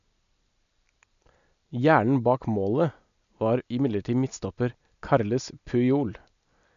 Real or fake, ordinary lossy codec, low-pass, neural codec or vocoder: real; none; 7.2 kHz; none